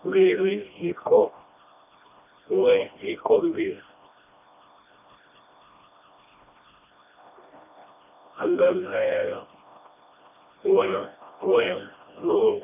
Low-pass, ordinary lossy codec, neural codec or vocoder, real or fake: 3.6 kHz; none; codec, 16 kHz, 1 kbps, FreqCodec, smaller model; fake